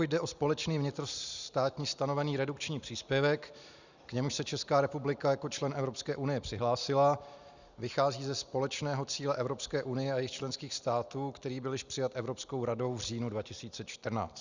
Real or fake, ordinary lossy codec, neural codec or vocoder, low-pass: real; Opus, 64 kbps; none; 7.2 kHz